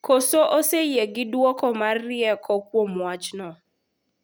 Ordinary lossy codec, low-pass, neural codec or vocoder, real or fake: none; none; none; real